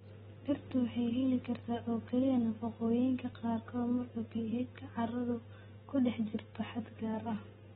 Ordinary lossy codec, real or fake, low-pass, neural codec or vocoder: AAC, 16 kbps; real; 19.8 kHz; none